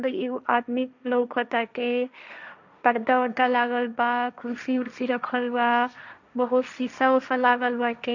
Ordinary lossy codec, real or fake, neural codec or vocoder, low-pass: none; fake; codec, 16 kHz, 1.1 kbps, Voila-Tokenizer; 7.2 kHz